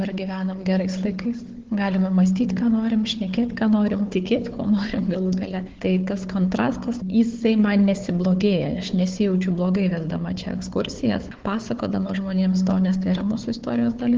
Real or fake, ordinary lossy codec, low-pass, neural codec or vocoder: fake; Opus, 16 kbps; 7.2 kHz; codec, 16 kHz, 4 kbps, FunCodec, trained on Chinese and English, 50 frames a second